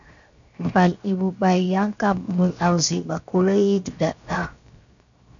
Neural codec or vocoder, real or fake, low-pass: codec, 16 kHz, 0.7 kbps, FocalCodec; fake; 7.2 kHz